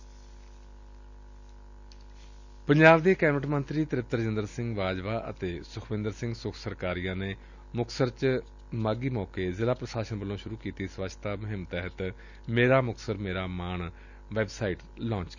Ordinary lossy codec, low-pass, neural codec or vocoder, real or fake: none; 7.2 kHz; none; real